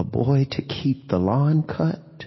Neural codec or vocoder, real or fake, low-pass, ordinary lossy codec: none; real; 7.2 kHz; MP3, 24 kbps